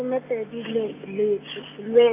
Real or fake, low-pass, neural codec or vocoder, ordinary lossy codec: real; 3.6 kHz; none; none